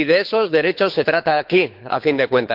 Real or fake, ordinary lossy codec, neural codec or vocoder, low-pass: fake; MP3, 48 kbps; codec, 24 kHz, 6 kbps, HILCodec; 5.4 kHz